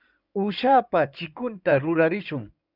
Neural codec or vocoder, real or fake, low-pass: codec, 16 kHz in and 24 kHz out, 2.2 kbps, FireRedTTS-2 codec; fake; 5.4 kHz